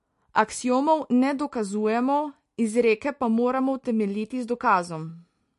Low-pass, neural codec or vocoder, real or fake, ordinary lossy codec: 14.4 kHz; none; real; MP3, 48 kbps